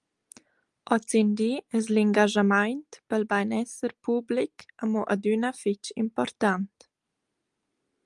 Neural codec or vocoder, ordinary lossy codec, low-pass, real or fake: none; Opus, 32 kbps; 10.8 kHz; real